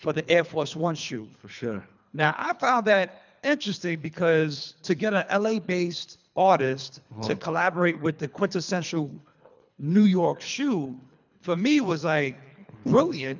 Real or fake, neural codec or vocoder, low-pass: fake; codec, 24 kHz, 3 kbps, HILCodec; 7.2 kHz